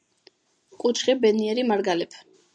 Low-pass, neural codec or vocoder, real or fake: 9.9 kHz; none; real